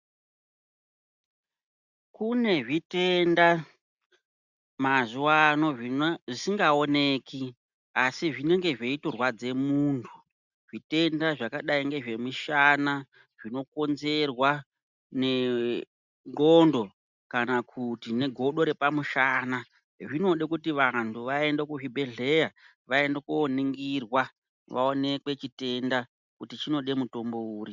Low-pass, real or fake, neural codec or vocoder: 7.2 kHz; real; none